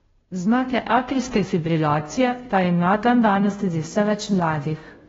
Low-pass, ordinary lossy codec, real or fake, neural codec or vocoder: 7.2 kHz; AAC, 24 kbps; fake; codec, 16 kHz, 0.5 kbps, FunCodec, trained on Chinese and English, 25 frames a second